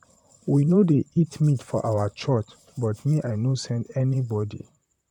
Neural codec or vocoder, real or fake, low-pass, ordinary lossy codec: vocoder, 44.1 kHz, 128 mel bands, Pupu-Vocoder; fake; 19.8 kHz; none